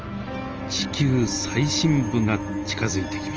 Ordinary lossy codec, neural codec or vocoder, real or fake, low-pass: Opus, 24 kbps; none; real; 7.2 kHz